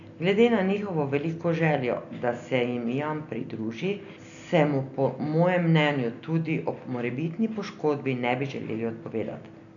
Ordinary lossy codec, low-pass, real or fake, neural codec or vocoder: none; 7.2 kHz; real; none